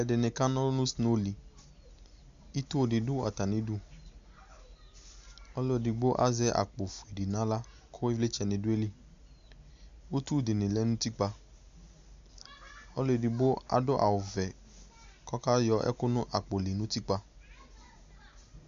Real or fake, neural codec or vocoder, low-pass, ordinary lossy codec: real; none; 7.2 kHz; Opus, 64 kbps